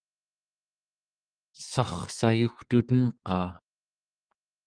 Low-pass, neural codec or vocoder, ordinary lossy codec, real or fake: 9.9 kHz; codec, 32 kHz, 1.9 kbps, SNAC; Opus, 32 kbps; fake